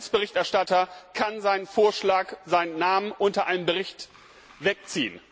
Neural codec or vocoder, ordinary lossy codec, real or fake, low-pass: none; none; real; none